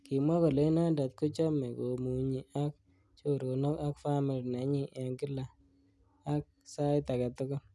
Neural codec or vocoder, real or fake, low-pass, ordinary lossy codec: none; real; none; none